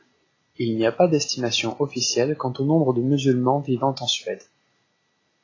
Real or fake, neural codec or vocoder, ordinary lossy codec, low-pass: real; none; AAC, 32 kbps; 7.2 kHz